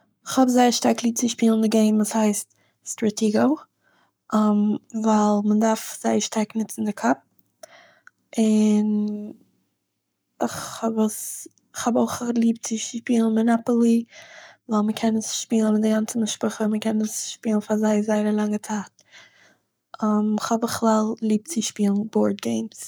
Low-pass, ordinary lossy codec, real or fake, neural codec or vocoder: none; none; fake; codec, 44.1 kHz, 7.8 kbps, Pupu-Codec